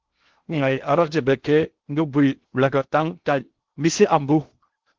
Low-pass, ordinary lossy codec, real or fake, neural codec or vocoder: 7.2 kHz; Opus, 16 kbps; fake; codec, 16 kHz in and 24 kHz out, 0.6 kbps, FocalCodec, streaming, 2048 codes